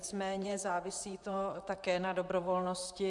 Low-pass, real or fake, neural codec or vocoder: 10.8 kHz; fake; vocoder, 44.1 kHz, 128 mel bands, Pupu-Vocoder